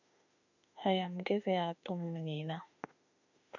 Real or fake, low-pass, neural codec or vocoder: fake; 7.2 kHz; autoencoder, 48 kHz, 32 numbers a frame, DAC-VAE, trained on Japanese speech